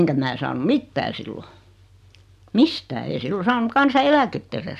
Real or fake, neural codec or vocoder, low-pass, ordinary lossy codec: real; none; 14.4 kHz; none